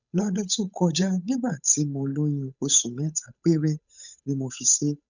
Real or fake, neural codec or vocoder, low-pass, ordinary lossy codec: fake; codec, 16 kHz, 8 kbps, FunCodec, trained on Chinese and English, 25 frames a second; 7.2 kHz; none